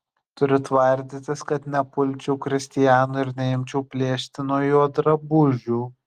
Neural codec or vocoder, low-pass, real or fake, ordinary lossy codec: none; 14.4 kHz; real; Opus, 24 kbps